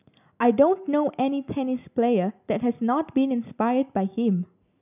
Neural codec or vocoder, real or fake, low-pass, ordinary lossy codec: none; real; 3.6 kHz; none